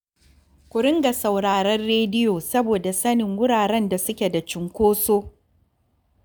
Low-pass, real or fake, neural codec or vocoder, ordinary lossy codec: none; real; none; none